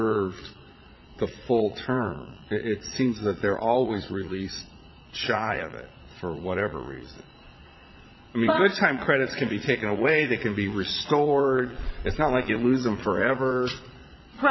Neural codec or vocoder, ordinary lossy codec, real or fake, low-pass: vocoder, 22.05 kHz, 80 mel bands, Vocos; MP3, 24 kbps; fake; 7.2 kHz